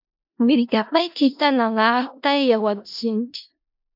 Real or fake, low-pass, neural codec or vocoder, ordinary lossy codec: fake; 5.4 kHz; codec, 16 kHz in and 24 kHz out, 0.4 kbps, LongCat-Audio-Codec, four codebook decoder; MP3, 48 kbps